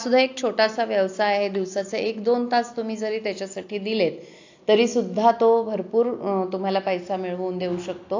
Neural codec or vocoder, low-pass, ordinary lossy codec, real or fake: none; 7.2 kHz; AAC, 48 kbps; real